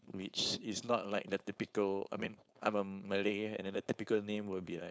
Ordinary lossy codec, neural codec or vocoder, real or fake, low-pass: none; codec, 16 kHz, 4.8 kbps, FACodec; fake; none